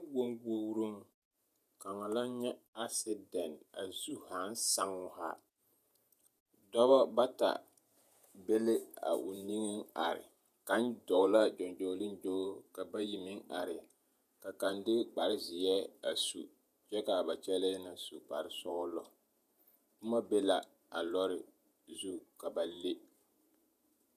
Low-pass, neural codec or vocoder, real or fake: 14.4 kHz; vocoder, 48 kHz, 128 mel bands, Vocos; fake